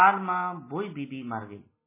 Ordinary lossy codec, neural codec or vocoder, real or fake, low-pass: MP3, 16 kbps; none; real; 3.6 kHz